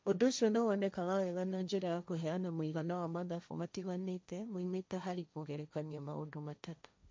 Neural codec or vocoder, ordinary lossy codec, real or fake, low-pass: codec, 16 kHz, 1.1 kbps, Voila-Tokenizer; none; fake; none